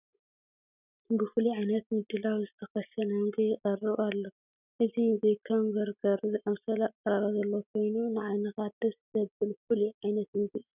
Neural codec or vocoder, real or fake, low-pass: none; real; 3.6 kHz